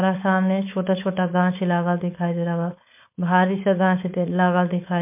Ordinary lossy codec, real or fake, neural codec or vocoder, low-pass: MP3, 32 kbps; fake; codec, 16 kHz, 4.8 kbps, FACodec; 3.6 kHz